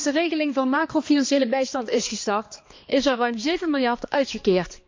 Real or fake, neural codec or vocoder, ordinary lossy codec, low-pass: fake; codec, 16 kHz, 2 kbps, X-Codec, HuBERT features, trained on balanced general audio; MP3, 48 kbps; 7.2 kHz